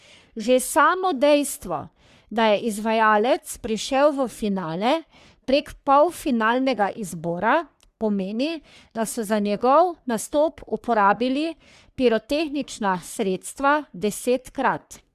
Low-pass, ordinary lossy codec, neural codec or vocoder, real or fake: 14.4 kHz; Opus, 64 kbps; codec, 44.1 kHz, 3.4 kbps, Pupu-Codec; fake